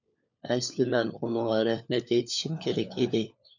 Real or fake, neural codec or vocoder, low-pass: fake; codec, 16 kHz, 4 kbps, FunCodec, trained on LibriTTS, 50 frames a second; 7.2 kHz